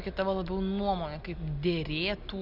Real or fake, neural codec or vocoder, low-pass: real; none; 5.4 kHz